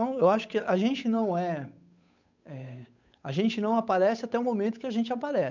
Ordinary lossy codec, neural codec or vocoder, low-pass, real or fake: none; codec, 16 kHz, 8 kbps, FunCodec, trained on Chinese and English, 25 frames a second; 7.2 kHz; fake